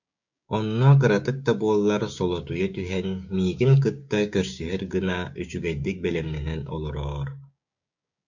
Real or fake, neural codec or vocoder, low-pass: fake; codec, 16 kHz, 6 kbps, DAC; 7.2 kHz